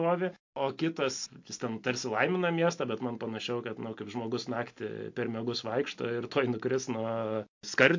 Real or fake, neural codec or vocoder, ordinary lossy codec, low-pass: real; none; MP3, 48 kbps; 7.2 kHz